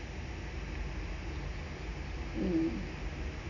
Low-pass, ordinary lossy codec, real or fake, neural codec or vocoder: 7.2 kHz; none; real; none